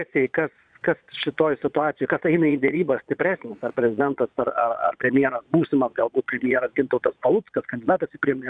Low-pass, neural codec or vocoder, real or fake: 9.9 kHz; vocoder, 22.05 kHz, 80 mel bands, Vocos; fake